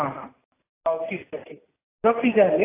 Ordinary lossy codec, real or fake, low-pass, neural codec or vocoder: AAC, 24 kbps; real; 3.6 kHz; none